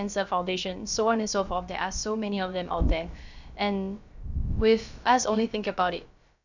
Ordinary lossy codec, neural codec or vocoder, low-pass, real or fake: none; codec, 16 kHz, about 1 kbps, DyCAST, with the encoder's durations; 7.2 kHz; fake